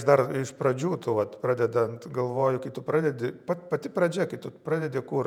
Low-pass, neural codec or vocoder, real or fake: 19.8 kHz; vocoder, 48 kHz, 128 mel bands, Vocos; fake